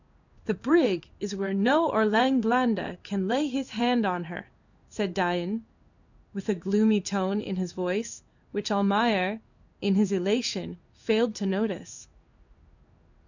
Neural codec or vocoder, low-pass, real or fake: codec, 16 kHz in and 24 kHz out, 1 kbps, XY-Tokenizer; 7.2 kHz; fake